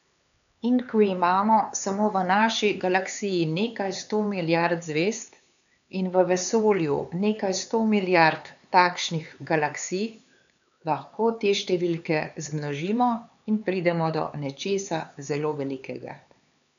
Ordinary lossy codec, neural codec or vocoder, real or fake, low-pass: none; codec, 16 kHz, 4 kbps, X-Codec, HuBERT features, trained on LibriSpeech; fake; 7.2 kHz